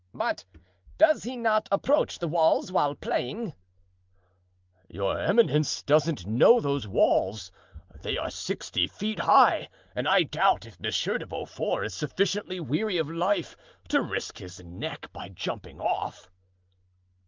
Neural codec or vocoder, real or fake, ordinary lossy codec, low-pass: none; real; Opus, 24 kbps; 7.2 kHz